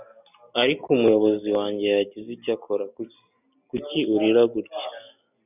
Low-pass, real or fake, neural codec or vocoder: 3.6 kHz; real; none